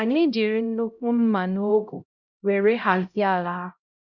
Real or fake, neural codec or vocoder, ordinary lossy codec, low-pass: fake; codec, 16 kHz, 0.5 kbps, X-Codec, HuBERT features, trained on LibriSpeech; none; 7.2 kHz